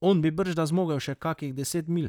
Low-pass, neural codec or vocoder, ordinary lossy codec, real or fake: 19.8 kHz; vocoder, 44.1 kHz, 128 mel bands, Pupu-Vocoder; none; fake